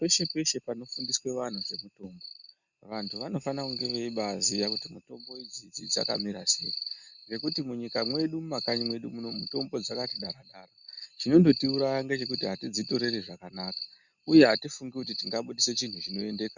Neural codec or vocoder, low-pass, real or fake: none; 7.2 kHz; real